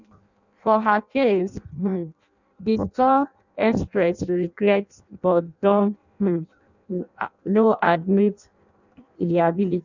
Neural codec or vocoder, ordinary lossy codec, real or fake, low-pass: codec, 16 kHz in and 24 kHz out, 0.6 kbps, FireRedTTS-2 codec; none; fake; 7.2 kHz